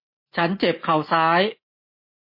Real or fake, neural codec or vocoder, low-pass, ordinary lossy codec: real; none; 5.4 kHz; MP3, 24 kbps